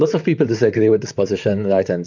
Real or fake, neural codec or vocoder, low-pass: fake; vocoder, 44.1 kHz, 128 mel bands, Pupu-Vocoder; 7.2 kHz